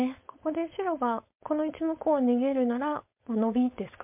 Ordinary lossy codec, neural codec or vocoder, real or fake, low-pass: MP3, 32 kbps; codec, 16 kHz, 4.8 kbps, FACodec; fake; 3.6 kHz